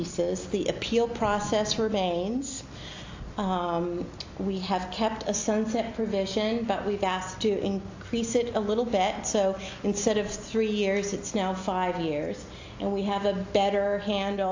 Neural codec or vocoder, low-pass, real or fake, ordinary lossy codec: none; 7.2 kHz; real; AAC, 48 kbps